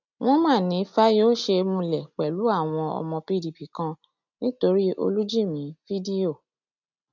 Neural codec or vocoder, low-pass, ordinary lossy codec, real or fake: none; 7.2 kHz; none; real